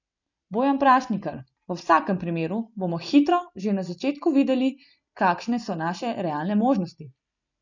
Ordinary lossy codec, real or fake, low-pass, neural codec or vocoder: none; real; 7.2 kHz; none